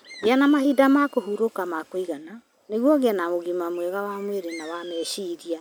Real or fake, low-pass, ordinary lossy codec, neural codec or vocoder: real; none; none; none